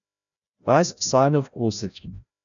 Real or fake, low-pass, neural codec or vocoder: fake; 7.2 kHz; codec, 16 kHz, 0.5 kbps, FreqCodec, larger model